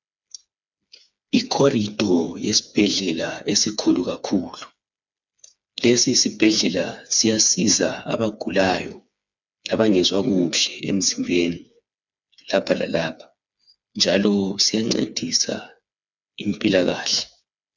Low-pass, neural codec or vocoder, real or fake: 7.2 kHz; codec, 16 kHz, 4 kbps, FreqCodec, smaller model; fake